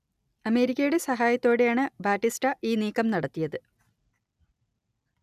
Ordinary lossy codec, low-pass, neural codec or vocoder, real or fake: none; 14.4 kHz; none; real